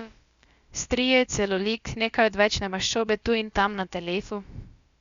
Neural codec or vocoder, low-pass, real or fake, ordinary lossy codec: codec, 16 kHz, about 1 kbps, DyCAST, with the encoder's durations; 7.2 kHz; fake; Opus, 64 kbps